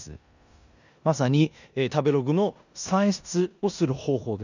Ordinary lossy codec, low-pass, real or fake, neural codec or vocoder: none; 7.2 kHz; fake; codec, 16 kHz in and 24 kHz out, 0.9 kbps, LongCat-Audio-Codec, four codebook decoder